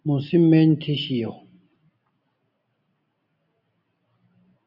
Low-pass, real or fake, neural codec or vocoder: 5.4 kHz; real; none